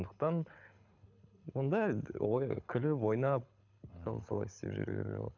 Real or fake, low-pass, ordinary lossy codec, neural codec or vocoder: fake; 7.2 kHz; none; codec, 24 kHz, 6 kbps, HILCodec